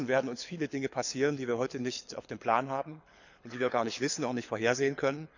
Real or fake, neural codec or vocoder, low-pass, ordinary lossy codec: fake; codec, 24 kHz, 6 kbps, HILCodec; 7.2 kHz; none